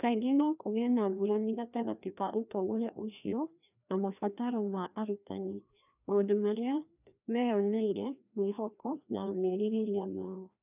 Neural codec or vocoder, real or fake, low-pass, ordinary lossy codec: codec, 16 kHz, 1 kbps, FreqCodec, larger model; fake; 3.6 kHz; none